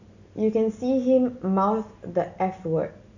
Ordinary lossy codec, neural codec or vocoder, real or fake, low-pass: none; none; real; 7.2 kHz